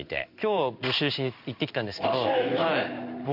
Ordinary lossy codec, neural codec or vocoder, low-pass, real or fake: none; codec, 16 kHz in and 24 kHz out, 1 kbps, XY-Tokenizer; 5.4 kHz; fake